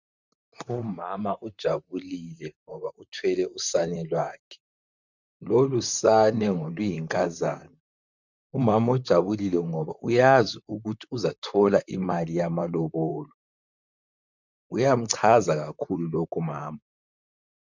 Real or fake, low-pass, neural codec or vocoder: fake; 7.2 kHz; vocoder, 44.1 kHz, 128 mel bands, Pupu-Vocoder